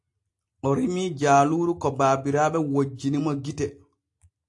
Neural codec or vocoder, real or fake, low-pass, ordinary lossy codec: none; real; 10.8 kHz; AAC, 48 kbps